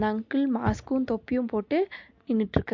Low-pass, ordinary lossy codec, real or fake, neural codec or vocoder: 7.2 kHz; MP3, 48 kbps; real; none